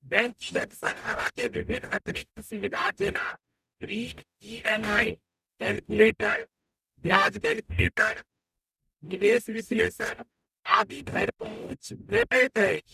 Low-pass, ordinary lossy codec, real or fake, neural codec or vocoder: 14.4 kHz; none; fake; codec, 44.1 kHz, 0.9 kbps, DAC